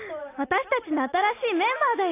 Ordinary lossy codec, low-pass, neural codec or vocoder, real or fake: AAC, 24 kbps; 3.6 kHz; none; real